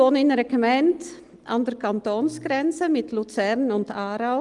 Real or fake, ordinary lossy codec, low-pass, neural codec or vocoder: real; Opus, 24 kbps; 10.8 kHz; none